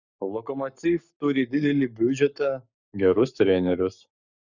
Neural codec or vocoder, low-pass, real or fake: codec, 44.1 kHz, 7.8 kbps, Pupu-Codec; 7.2 kHz; fake